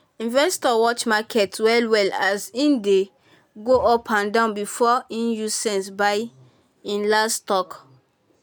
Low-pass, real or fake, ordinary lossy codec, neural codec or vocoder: none; real; none; none